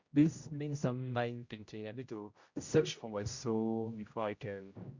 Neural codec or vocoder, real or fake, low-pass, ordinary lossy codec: codec, 16 kHz, 0.5 kbps, X-Codec, HuBERT features, trained on general audio; fake; 7.2 kHz; Opus, 64 kbps